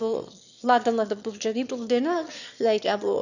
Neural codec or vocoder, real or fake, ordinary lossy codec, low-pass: autoencoder, 22.05 kHz, a latent of 192 numbers a frame, VITS, trained on one speaker; fake; none; 7.2 kHz